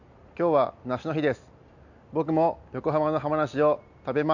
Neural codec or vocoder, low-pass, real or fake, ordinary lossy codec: none; 7.2 kHz; real; none